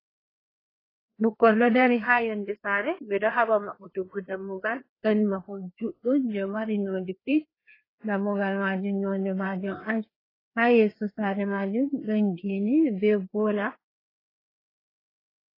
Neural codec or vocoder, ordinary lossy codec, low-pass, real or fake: codec, 16 kHz, 2 kbps, FreqCodec, larger model; AAC, 24 kbps; 5.4 kHz; fake